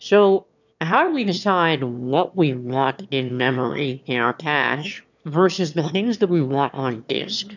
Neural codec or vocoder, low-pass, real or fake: autoencoder, 22.05 kHz, a latent of 192 numbers a frame, VITS, trained on one speaker; 7.2 kHz; fake